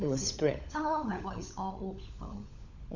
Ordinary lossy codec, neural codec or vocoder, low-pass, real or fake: none; codec, 16 kHz, 16 kbps, FunCodec, trained on LibriTTS, 50 frames a second; 7.2 kHz; fake